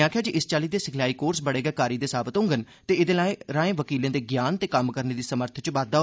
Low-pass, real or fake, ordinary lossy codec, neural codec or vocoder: none; real; none; none